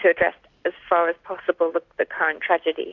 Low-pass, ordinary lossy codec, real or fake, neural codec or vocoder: 7.2 kHz; AAC, 48 kbps; real; none